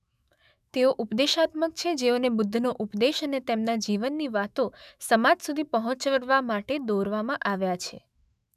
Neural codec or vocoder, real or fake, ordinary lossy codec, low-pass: autoencoder, 48 kHz, 128 numbers a frame, DAC-VAE, trained on Japanese speech; fake; none; 14.4 kHz